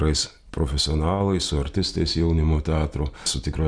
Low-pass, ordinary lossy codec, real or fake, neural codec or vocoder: 9.9 kHz; Opus, 64 kbps; real; none